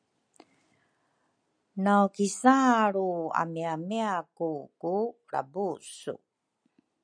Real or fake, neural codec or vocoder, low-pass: real; none; 9.9 kHz